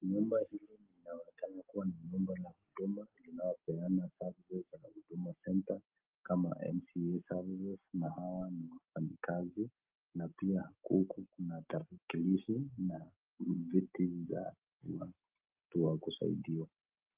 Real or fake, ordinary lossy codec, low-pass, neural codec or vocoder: real; Opus, 32 kbps; 3.6 kHz; none